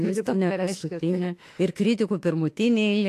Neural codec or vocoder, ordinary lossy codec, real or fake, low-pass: autoencoder, 48 kHz, 32 numbers a frame, DAC-VAE, trained on Japanese speech; AAC, 64 kbps; fake; 14.4 kHz